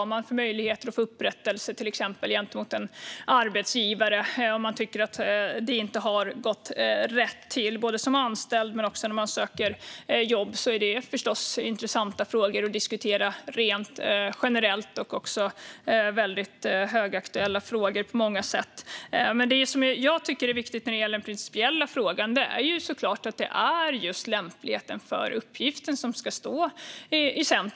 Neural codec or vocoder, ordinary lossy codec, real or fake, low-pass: none; none; real; none